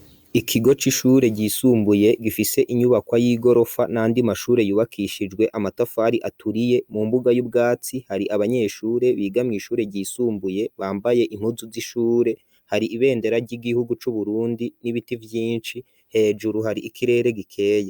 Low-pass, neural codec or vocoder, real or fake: 19.8 kHz; none; real